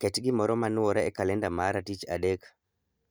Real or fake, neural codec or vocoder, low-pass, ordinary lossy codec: real; none; none; none